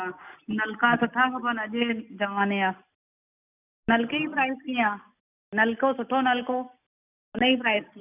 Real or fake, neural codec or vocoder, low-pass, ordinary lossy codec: real; none; 3.6 kHz; none